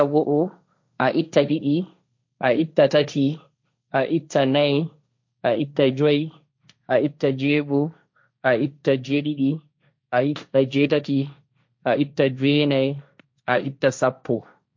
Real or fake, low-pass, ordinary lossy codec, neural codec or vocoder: fake; 7.2 kHz; MP3, 48 kbps; codec, 16 kHz, 1.1 kbps, Voila-Tokenizer